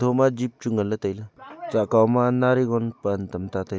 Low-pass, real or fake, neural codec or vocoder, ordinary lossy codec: none; real; none; none